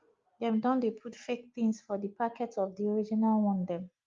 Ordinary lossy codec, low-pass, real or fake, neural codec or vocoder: Opus, 32 kbps; 7.2 kHz; real; none